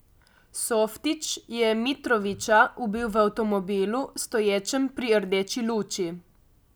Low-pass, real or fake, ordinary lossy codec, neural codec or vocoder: none; real; none; none